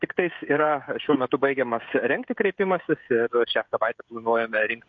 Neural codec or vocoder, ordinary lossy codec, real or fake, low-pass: codec, 16 kHz, 16 kbps, FreqCodec, smaller model; MP3, 48 kbps; fake; 7.2 kHz